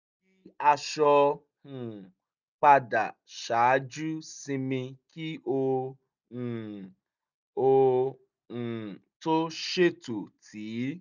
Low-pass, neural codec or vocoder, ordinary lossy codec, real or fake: 7.2 kHz; none; none; real